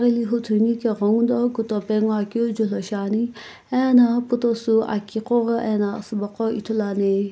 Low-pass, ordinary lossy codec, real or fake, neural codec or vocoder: none; none; real; none